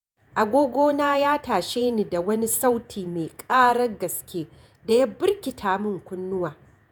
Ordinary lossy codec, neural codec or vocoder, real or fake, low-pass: none; vocoder, 48 kHz, 128 mel bands, Vocos; fake; none